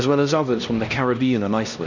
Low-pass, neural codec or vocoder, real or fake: 7.2 kHz; codec, 16 kHz, 1 kbps, X-Codec, HuBERT features, trained on LibriSpeech; fake